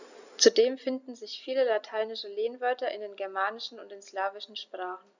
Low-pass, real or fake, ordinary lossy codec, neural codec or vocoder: 7.2 kHz; real; none; none